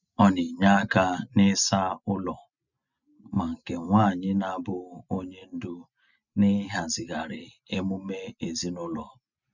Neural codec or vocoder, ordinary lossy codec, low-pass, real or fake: none; none; 7.2 kHz; real